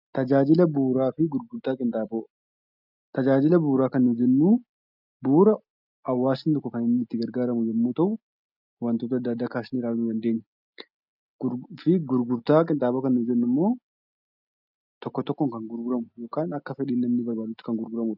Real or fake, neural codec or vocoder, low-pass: real; none; 5.4 kHz